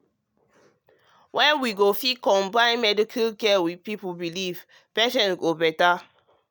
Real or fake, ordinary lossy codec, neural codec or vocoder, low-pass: real; none; none; none